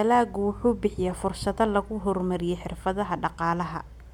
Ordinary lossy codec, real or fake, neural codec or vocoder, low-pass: MP3, 96 kbps; real; none; 19.8 kHz